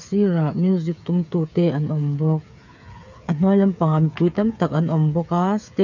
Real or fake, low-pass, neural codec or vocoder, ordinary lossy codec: fake; 7.2 kHz; codec, 16 kHz, 8 kbps, FreqCodec, smaller model; none